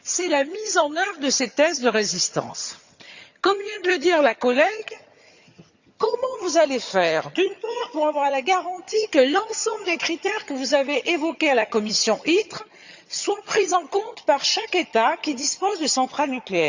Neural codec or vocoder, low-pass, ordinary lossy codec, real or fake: vocoder, 22.05 kHz, 80 mel bands, HiFi-GAN; 7.2 kHz; Opus, 64 kbps; fake